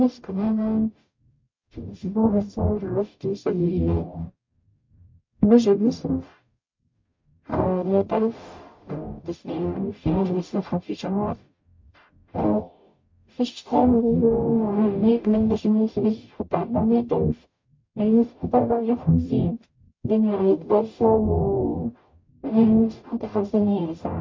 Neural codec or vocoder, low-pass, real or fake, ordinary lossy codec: codec, 44.1 kHz, 0.9 kbps, DAC; 7.2 kHz; fake; MP3, 48 kbps